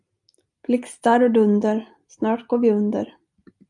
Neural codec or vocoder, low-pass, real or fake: none; 10.8 kHz; real